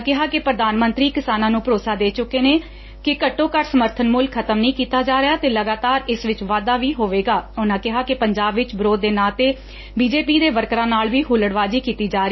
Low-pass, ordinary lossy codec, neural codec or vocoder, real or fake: 7.2 kHz; MP3, 24 kbps; none; real